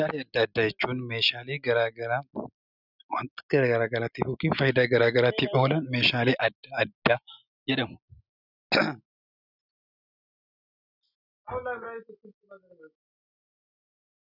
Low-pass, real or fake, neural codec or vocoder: 5.4 kHz; real; none